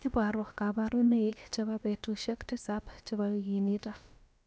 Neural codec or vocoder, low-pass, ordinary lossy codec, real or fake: codec, 16 kHz, about 1 kbps, DyCAST, with the encoder's durations; none; none; fake